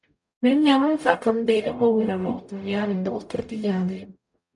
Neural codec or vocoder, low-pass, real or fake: codec, 44.1 kHz, 0.9 kbps, DAC; 10.8 kHz; fake